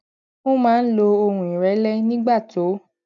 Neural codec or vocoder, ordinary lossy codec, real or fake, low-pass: none; none; real; 7.2 kHz